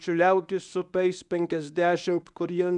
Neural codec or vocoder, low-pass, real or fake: codec, 24 kHz, 0.9 kbps, WavTokenizer, medium speech release version 1; 10.8 kHz; fake